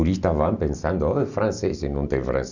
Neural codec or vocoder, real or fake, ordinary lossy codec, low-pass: none; real; none; 7.2 kHz